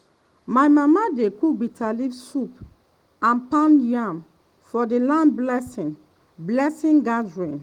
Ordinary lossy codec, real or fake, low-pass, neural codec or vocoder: Opus, 24 kbps; real; 19.8 kHz; none